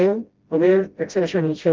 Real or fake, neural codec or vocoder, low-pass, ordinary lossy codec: fake; codec, 16 kHz, 0.5 kbps, FreqCodec, smaller model; 7.2 kHz; Opus, 32 kbps